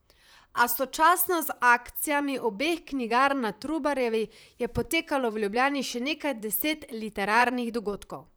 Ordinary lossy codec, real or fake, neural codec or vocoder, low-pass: none; fake; vocoder, 44.1 kHz, 128 mel bands, Pupu-Vocoder; none